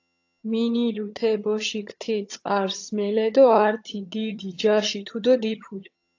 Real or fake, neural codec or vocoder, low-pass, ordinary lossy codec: fake; vocoder, 22.05 kHz, 80 mel bands, HiFi-GAN; 7.2 kHz; AAC, 48 kbps